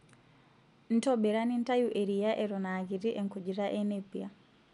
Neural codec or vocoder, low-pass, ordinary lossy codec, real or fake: none; 10.8 kHz; none; real